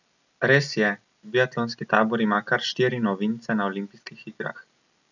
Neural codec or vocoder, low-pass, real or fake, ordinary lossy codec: none; 7.2 kHz; real; none